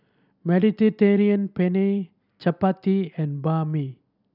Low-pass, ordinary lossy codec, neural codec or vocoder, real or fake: 5.4 kHz; none; none; real